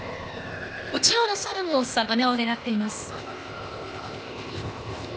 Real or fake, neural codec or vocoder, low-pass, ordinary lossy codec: fake; codec, 16 kHz, 0.8 kbps, ZipCodec; none; none